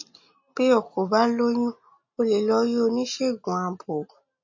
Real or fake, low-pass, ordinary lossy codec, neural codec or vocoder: real; 7.2 kHz; MP3, 32 kbps; none